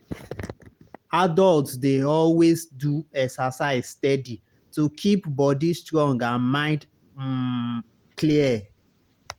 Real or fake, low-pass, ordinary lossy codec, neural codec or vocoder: real; 19.8 kHz; Opus, 24 kbps; none